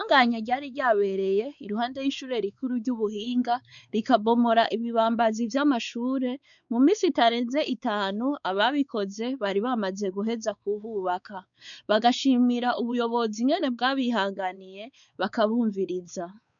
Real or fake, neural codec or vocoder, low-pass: fake; codec, 16 kHz, 4 kbps, X-Codec, WavLM features, trained on Multilingual LibriSpeech; 7.2 kHz